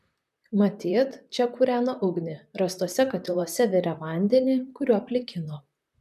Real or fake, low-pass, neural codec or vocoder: fake; 14.4 kHz; vocoder, 44.1 kHz, 128 mel bands, Pupu-Vocoder